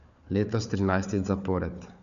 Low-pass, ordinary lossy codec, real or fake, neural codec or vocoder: 7.2 kHz; none; fake; codec, 16 kHz, 4 kbps, FunCodec, trained on Chinese and English, 50 frames a second